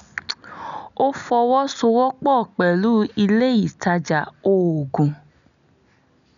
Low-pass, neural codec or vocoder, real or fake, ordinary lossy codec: 7.2 kHz; none; real; none